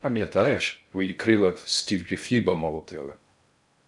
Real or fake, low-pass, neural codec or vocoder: fake; 10.8 kHz; codec, 16 kHz in and 24 kHz out, 0.6 kbps, FocalCodec, streaming, 4096 codes